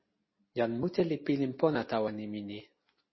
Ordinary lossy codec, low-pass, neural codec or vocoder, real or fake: MP3, 24 kbps; 7.2 kHz; none; real